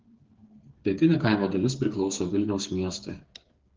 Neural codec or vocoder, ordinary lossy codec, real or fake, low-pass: codec, 16 kHz, 8 kbps, FreqCodec, smaller model; Opus, 16 kbps; fake; 7.2 kHz